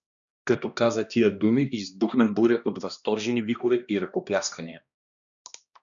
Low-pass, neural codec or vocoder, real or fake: 7.2 kHz; codec, 16 kHz, 1 kbps, X-Codec, HuBERT features, trained on balanced general audio; fake